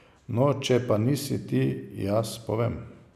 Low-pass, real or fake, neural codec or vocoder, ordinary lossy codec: 14.4 kHz; real; none; none